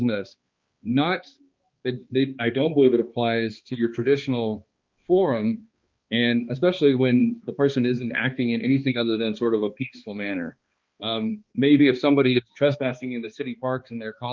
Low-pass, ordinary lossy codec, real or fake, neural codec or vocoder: 7.2 kHz; Opus, 32 kbps; fake; codec, 16 kHz, 2 kbps, X-Codec, HuBERT features, trained on balanced general audio